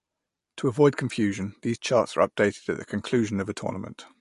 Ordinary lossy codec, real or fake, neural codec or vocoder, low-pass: MP3, 48 kbps; real; none; 14.4 kHz